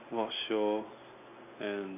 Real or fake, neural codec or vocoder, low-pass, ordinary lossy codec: real; none; 3.6 kHz; none